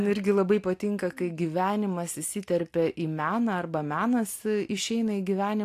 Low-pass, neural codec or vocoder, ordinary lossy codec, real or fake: 14.4 kHz; none; AAC, 64 kbps; real